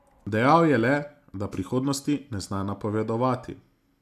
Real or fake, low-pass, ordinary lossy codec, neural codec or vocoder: real; 14.4 kHz; none; none